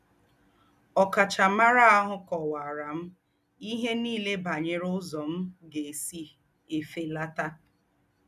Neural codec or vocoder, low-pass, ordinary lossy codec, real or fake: none; 14.4 kHz; none; real